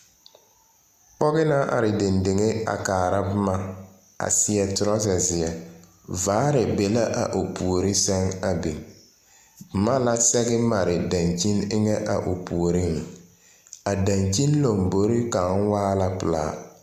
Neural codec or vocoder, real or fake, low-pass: none; real; 14.4 kHz